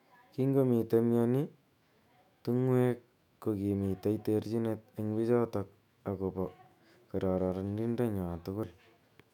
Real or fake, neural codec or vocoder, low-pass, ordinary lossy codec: fake; autoencoder, 48 kHz, 128 numbers a frame, DAC-VAE, trained on Japanese speech; 19.8 kHz; none